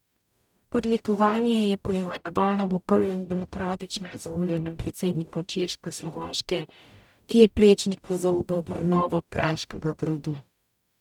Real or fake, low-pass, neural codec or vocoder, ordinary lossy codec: fake; 19.8 kHz; codec, 44.1 kHz, 0.9 kbps, DAC; none